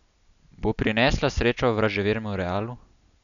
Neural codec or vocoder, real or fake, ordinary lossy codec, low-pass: none; real; none; 7.2 kHz